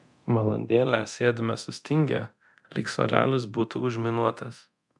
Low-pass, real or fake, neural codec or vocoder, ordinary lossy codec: 10.8 kHz; fake; codec, 24 kHz, 0.9 kbps, DualCodec; MP3, 96 kbps